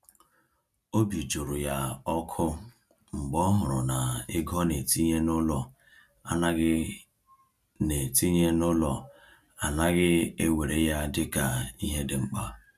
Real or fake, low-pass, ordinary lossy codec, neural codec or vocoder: real; 14.4 kHz; none; none